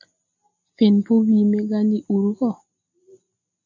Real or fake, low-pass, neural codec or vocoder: real; 7.2 kHz; none